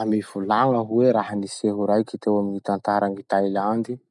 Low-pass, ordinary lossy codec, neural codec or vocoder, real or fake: 10.8 kHz; none; none; real